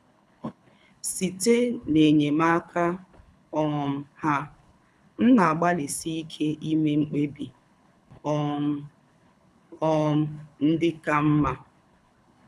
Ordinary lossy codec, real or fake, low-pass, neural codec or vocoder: none; fake; none; codec, 24 kHz, 6 kbps, HILCodec